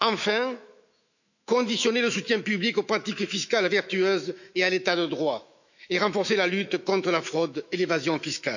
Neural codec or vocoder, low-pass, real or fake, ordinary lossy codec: autoencoder, 48 kHz, 128 numbers a frame, DAC-VAE, trained on Japanese speech; 7.2 kHz; fake; none